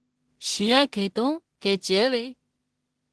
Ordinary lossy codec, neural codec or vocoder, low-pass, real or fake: Opus, 16 kbps; codec, 16 kHz in and 24 kHz out, 0.4 kbps, LongCat-Audio-Codec, two codebook decoder; 10.8 kHz; fake